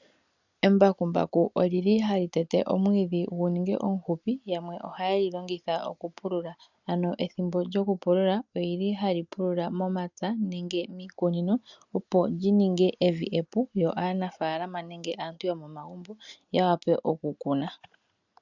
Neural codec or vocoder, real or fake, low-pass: none; real; 7.2 kHz